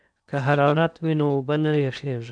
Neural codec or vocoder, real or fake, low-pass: codec, 16 kHz in and 24 kHz out, 0.8 kbps, FocalCodec, streaming, 65536 codes; fake; 9.9 kHz